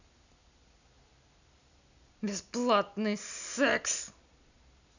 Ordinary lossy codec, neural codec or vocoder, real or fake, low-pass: none; none; real; 7.2 kHz